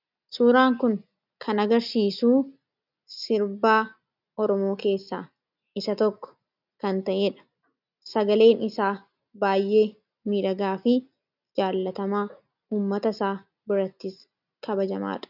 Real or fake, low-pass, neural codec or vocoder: real; 5.4 kHz; none